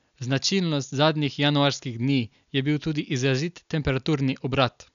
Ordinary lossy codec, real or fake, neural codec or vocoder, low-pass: none; real; none; 7.2 kHz